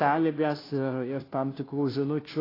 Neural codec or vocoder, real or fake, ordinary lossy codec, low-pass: codec, 16 kHz, 0.5 kbps, FunCodec, trained on Chinese and English, 25 frames a second; fake; AAC, 24 kbps; 5.4 kHz